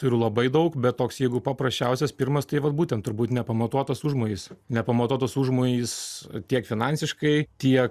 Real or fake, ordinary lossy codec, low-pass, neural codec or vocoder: real; Opus, 64 kbps; 14.4 kHz; none